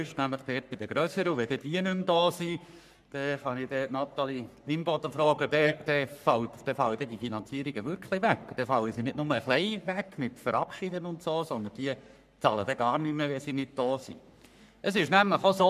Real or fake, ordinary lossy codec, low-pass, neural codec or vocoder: fake; none; 14.4 kHz; codec, 44.1 kHz, 3.4 kbps, Pupu-Codec